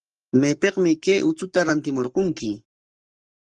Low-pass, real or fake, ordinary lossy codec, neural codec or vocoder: 10.8 kHz; fake; Opus, 24 kbps; codec, 44.1 kHz, 7.8 kbps, Pupu-Codec